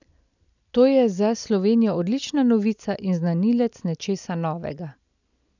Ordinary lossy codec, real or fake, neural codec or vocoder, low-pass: none; real; none; 7.2 kHz